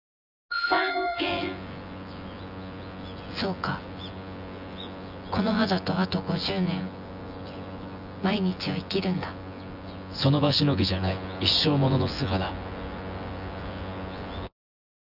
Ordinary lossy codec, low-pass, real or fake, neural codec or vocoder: none; 5.4 kHz; fake; vocoder, 24 kHz, 100 mel bands, Vocos